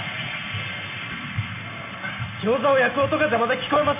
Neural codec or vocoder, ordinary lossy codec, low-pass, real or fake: none; none; 3.6 kHz; real